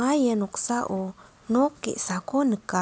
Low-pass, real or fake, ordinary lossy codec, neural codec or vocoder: none; real; none; none